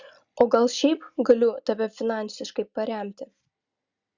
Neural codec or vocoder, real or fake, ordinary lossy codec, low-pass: none; real; Opus, 64 kbps; 7.2 kHz